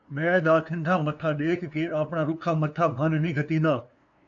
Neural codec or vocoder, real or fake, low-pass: codec, 16 kHz, 2 kbps, FunCodec, trained on LibriTTS, 25 frames a second; fake; 7.2 kHz